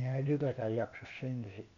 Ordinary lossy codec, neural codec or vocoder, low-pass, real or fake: none; codec, 16 kHz, 0.8 kbps, ZipCodec; 7.2 kHz; fake